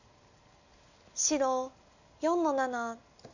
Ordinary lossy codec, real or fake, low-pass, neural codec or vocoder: none; real; 7.2 kHz; none